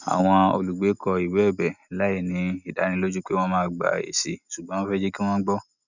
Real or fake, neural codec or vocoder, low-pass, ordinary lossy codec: real; none; 7.2 kHz; none